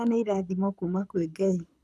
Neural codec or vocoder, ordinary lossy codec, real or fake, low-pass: codec, 24 kHz, 6 kbps, HILCodec; none; fake; none